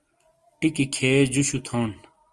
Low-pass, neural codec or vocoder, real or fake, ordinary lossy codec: 10.8 kHz; none; real; Opus, 24 kbps